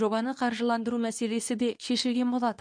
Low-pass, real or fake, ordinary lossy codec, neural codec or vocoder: 9.9 kHz; fake; none; codec, 24 kHz, 0.9 kbps, WavTokenizer, medium speech release version 2